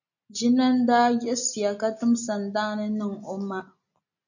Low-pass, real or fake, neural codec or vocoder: 7.2 kHz; real; none